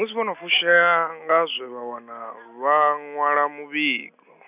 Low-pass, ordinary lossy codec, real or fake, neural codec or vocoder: 3.6 kHz; none; real; none